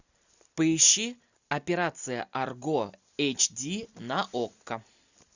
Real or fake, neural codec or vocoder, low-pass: real; none; 7.2 kHz